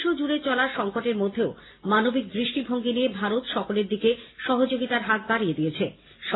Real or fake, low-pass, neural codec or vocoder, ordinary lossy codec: real; 7.2 kHz; none; AAC, 16 kbps